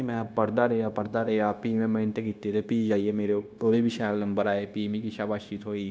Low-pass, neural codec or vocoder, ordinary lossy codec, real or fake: none; codec, 16 kHz, 0.9 kbps, LongCat-Audio-Codec; none; fake